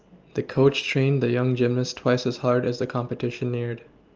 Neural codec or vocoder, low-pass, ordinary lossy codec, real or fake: none; 7.2 kHz; Opus, 24 kbps; real